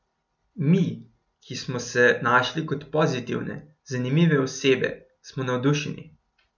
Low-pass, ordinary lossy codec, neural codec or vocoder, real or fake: 7.2 kHz; none; none; real